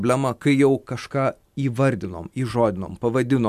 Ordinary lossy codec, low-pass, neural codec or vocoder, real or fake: MP3, 96 kbps; 14.4 kHz; none; real